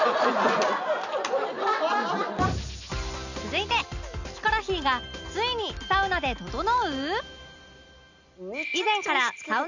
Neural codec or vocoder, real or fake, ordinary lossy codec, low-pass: none; real; none; 7.2 kHz